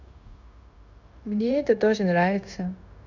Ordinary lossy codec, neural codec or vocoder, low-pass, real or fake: none; codec, 16 kHz, 0.9 kbps, LongCat-Audio-Codec; 7.2 kHz; fake